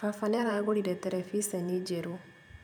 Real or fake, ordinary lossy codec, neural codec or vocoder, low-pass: fake; none; vocoder, 44.1 kHz, 128 mel bands every 512 samples, BigVGAN v2; none